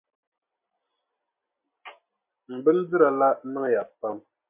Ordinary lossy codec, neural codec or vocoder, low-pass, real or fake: MP3, 24 kbps; none; 3.6 kHz; real